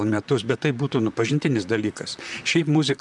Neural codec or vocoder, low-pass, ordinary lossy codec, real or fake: vocoder, 44.1 kHz, 128 mel bands, Pupu-Vocoder; 10.8 kHz; MP3, 96 kbps; fake